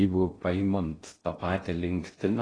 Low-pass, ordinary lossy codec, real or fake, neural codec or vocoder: 9.9 kHz; AAC, 32 kbps; fake; codec, 16 kHz in and 24 kHz out, 0.6 kbps, FocalCodec, streaming, 2048 codes